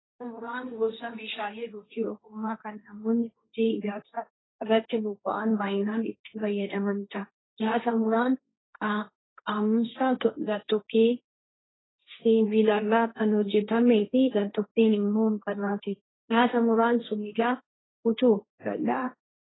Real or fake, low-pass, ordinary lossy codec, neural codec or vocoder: fake; 7.2 kHz; AAC, 16 kbps; codec, 16 kHz, 1.1 kbps, Voila-Tokenizer